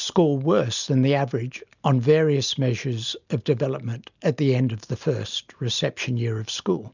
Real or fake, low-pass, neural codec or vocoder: real; 7.2 kHz; none